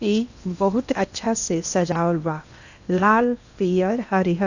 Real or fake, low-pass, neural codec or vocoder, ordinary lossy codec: fake; 7.2 kHz; codec, 16 kHz in and 24 kHz out, 0.8 kbps, FocalCodec, streaming, 65536 codes; none